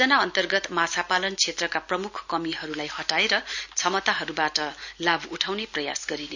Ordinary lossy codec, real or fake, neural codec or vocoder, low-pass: none; real; none; 7.2 kHz